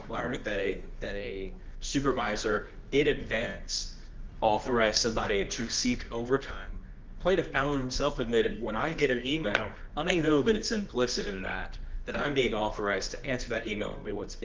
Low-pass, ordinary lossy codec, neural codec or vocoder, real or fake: 7.2 kHz; Opus, 32 kbps; codec, 24 kHz, 0.9 kbps, WavTokenizer, medium music audio release; fake